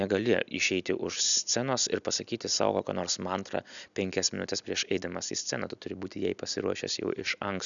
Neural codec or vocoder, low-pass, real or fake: none; 7.2 kHz; real